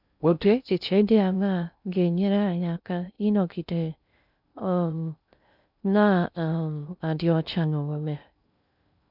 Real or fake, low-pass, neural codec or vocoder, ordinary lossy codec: fake; 5.4 kHz; codec, 16 kHz in and 24 kHz out, 0.6 kbps, FocalCodec, streaming, 2048 codes; none